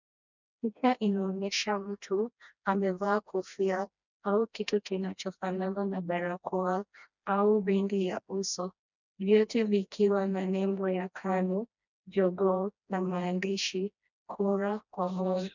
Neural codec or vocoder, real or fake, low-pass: codec, 16 kHz, 1 kbps, FreqCodec, smaller model; fake; 7.2 kHz